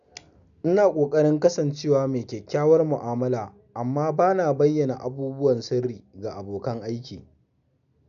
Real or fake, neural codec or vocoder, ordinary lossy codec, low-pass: real; none; none; 7.2 kHz